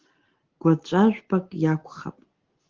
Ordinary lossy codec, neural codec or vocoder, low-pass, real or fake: Opus, 16 kbps; none; 7.2 kHz; real